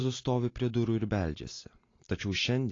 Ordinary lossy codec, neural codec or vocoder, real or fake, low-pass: AAC, 32 kbps; none; real; 7.2 kHz